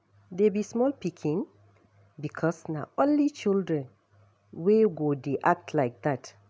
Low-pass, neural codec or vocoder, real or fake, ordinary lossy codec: none; none; real; none